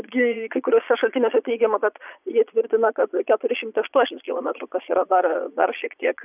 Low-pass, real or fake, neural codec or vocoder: 3.6 kHz; fake; vocoder, 22.05 kHz, 80 mel bands, Vocos